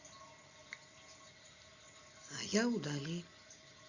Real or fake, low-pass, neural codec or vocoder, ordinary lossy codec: real; 7.2 kHz; none; Opus, 64 kbps